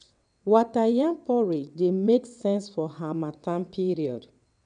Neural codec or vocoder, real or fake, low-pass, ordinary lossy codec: vocoder, 22.05 kHz, 80 mel bands, WaveNeXt; fake; 9.9 kHz; none